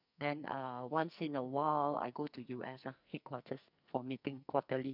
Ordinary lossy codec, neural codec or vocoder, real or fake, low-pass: none; codec, 44.1 kHz, 2.6 kbps, SNAC; fake; 5.4 kHz